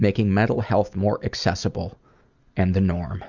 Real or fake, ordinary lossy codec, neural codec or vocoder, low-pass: real; Opus, 64 kbps; none; 7.2 kHz